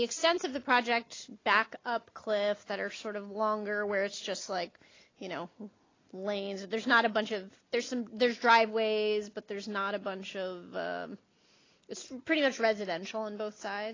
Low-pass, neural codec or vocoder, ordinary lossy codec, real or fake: 7.2 kHz; none; AAC, 32 kbps; real